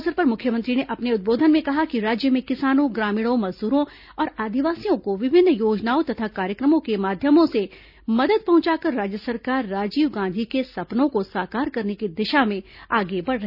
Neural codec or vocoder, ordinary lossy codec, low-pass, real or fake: none; none; 5.4 kHz; real